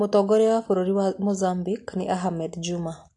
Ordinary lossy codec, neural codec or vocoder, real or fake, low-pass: AAC, 48 kbps; none; real; 10.8 kHz